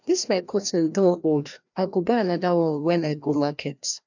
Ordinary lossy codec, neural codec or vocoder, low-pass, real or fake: none; codec, 16 kHz, 1 kbps, FreqCodec, larger model; 7.2 kHz; fake